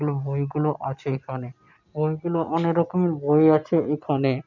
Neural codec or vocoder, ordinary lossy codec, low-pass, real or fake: none; none; 7.2 kHz; real